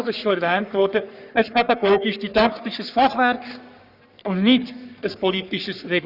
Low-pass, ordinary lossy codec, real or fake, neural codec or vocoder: 5.4 kHz; none; fake; codec, 44.1 kHz, 3.4 kbps, Pupu-Codec